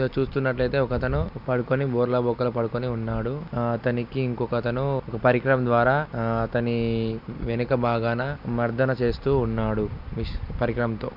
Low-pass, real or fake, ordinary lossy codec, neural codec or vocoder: 5.4 kHz; real; none; none